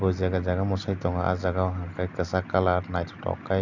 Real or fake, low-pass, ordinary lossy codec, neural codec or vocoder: real; 7.2 kHz; none; none